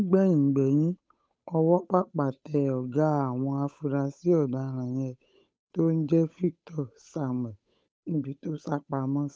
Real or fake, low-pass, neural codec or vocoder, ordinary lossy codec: fake; none; codec, 16 kHz, 8 kbps, FunCodec, trained on Chinese and English, 25 frames a second; none